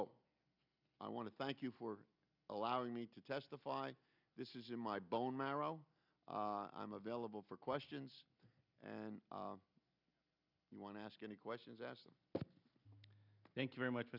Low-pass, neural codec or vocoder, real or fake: 5.4 kHz; none; real